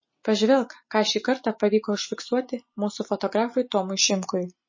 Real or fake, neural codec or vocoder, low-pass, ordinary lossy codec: real; none; 7.2 kHz; MP3, 32 kbps